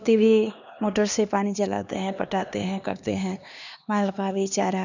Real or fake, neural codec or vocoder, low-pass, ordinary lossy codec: fake; codec, 16 kHz, 2 kbps, X-Codec, HuBERT features, trained on LibriSpeech; 7.2 kHz; none